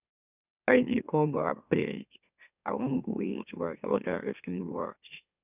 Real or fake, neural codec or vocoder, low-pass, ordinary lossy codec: fake; autoencoder, 44.1 kHz, a latent of 192 numbers a frame, MeloTTS; 3.6 kHz; none